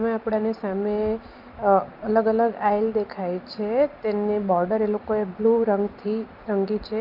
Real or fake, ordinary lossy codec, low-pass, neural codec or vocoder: real; Opus, 24 kbps; 5.4 kHz; none